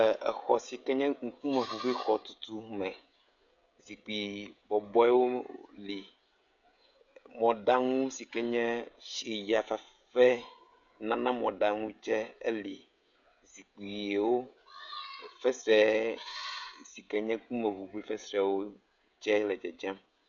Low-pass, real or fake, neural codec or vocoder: 7.2 kHz; fake; codec, 16 kHz, 16 kbps, FreqCodec, smaller model